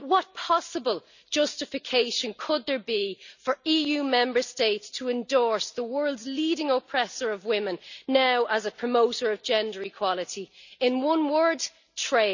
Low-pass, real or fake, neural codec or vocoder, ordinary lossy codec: 7.2 kHz; real; none; none